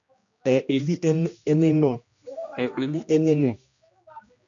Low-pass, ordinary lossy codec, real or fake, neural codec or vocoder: 7.2 kHz; MP3, 64 kbps; fake; codec, 16 kHz, 1 kbps, X-Codec, HuBERT features, trained on general audio